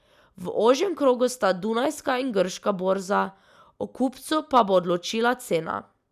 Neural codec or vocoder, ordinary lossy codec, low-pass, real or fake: none; none; 14.4 kHz; real